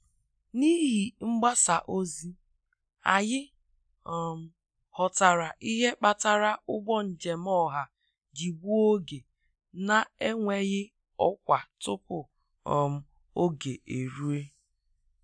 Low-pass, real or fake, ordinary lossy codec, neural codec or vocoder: 9.9 kHz; real; none; none